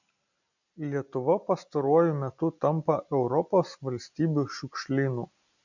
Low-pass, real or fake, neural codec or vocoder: 7.2 kHz; real; none